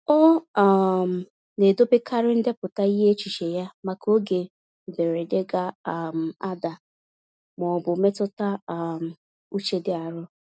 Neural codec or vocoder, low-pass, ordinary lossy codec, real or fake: none; none; none; real